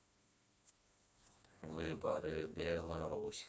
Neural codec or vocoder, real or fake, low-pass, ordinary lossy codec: codec, 16 kHz, 2 kbps, FreqCodec, smaller model; fake; none; none